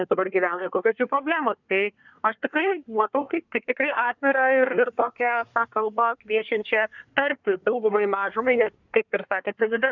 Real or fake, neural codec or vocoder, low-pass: fake; codec, 24 kHz, 1 kbps, SNAC; 7.2 kHz